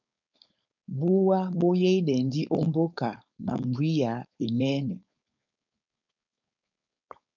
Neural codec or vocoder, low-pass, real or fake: codec, 16 kHz, 4.8 kbps, FACodec; 7.2 kHz; fake